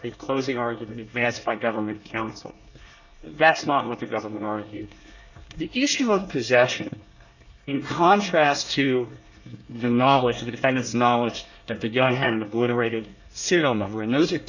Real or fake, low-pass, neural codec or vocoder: fake; 7.2 kHz; codec, 24 kHz, 1 kbps, SNAC